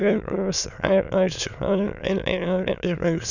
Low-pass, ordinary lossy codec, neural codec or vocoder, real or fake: 7.2 kHz; none; autoencoder, 22.05 kHz, a latent of 192 numbers a frame, VITS, trained on many speakers; fake